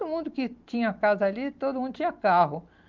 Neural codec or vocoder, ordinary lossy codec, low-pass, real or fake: none; Opus, 32 kbps; 7.2 kHz; real